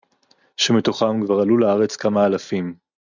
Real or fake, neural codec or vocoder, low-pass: real; none; 7.2 kHz